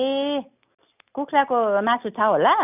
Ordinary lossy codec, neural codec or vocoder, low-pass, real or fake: none; none; 3.6 kHz; real